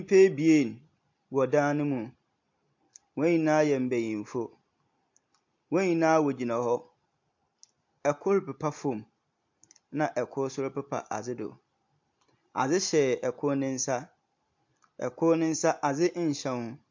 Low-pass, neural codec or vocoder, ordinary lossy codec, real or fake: 7.2 kHz; none; MP3, 48 kbps; real